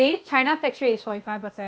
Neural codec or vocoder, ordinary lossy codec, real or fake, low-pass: codec, 16 kHz, 0.8 kbps, ZipCodec; none; fake; none